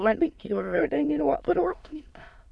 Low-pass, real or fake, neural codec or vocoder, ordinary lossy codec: none; fake; autoencoder, 22.05 kHz, a latent of 192 numbers a frame, VITS, trained on many speakers; none